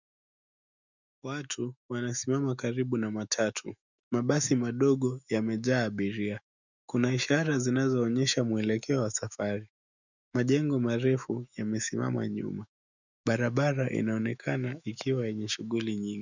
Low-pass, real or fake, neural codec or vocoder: 7.2 kHz; fake; autoencoder, 48 kHz, 128 numbers a frame, DAC-VAE, trained on Japanese speech